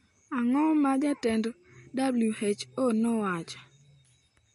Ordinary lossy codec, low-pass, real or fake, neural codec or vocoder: MP3, 48 kbps; 14.4 kHz; real; none